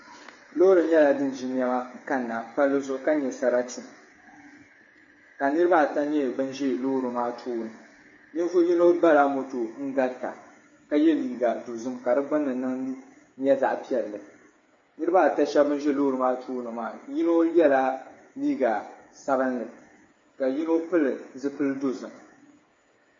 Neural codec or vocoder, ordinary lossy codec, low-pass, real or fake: codec, 16 kHz, 8 kbps, FreqCodec, smaller model; MP3, 32 kbps; 7.2 kHz; fake